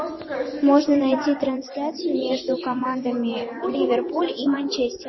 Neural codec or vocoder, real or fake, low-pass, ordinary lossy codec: vocoder, 24 kHz, 100 mel bands, Vocos; fake; 7.2 kHz; MP3, 24 kbps